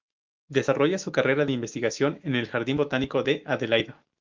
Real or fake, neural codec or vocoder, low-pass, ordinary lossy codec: fake; autoencoder, 48 kHz, 128 numbers a frame, DAC-VAE, trained on Japanese speech; 7.2 kHz; Opus, 24 kbps